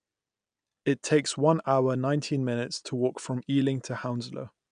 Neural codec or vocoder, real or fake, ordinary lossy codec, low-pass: none; real; none; 9.9 kHz